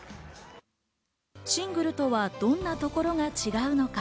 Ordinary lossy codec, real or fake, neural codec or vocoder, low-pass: none; real; none; none